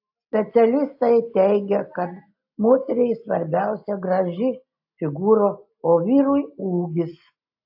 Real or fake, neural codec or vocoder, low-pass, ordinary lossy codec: real; none; 5.4 kHz; AAC, 48 kbps